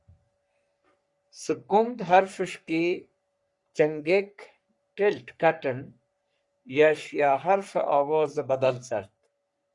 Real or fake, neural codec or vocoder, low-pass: fake; codec, 44.1 kHz, 3.4 kbps, Pupu-Codec; 10.8 kHz